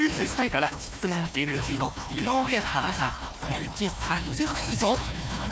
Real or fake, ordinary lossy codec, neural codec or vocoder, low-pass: fake; none; codec, 16 kHz, 1 kbps, FunCodec, trained on Chinese and English, 50 frames a second; none